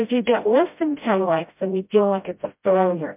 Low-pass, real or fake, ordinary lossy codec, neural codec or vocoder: 3.6 kHz; fake; MP3, 32 kbps; codec, 16 kHz, 0.5 kbps, FreqCodec, smaller model